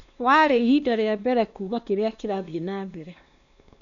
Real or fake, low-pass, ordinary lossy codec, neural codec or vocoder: fake; 7.2 kHz; none; codec, 16 kHz, 2 kbps, X-Codec, WavLM features, trained on Multilingual LibriSpeech